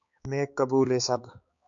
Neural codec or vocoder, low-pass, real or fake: codec, 16 kHz, 4 kbps, X-Codec, HuBERT features, trained on balanced general audio; 7.2 kHz; fake